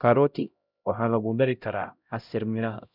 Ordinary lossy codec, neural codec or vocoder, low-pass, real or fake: none; codec, 16 kHz, 0.5 kbps, X-Codec, HuBERT features, trained on LibriSpeech; 5.4 kHz; fake